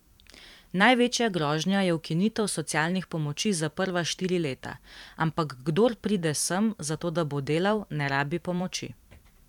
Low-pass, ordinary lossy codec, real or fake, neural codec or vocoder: 19.8 kHz; none; fake; vocoder, 44.1 kHz, 128 mel bands every 512 samples, BigVGAN v2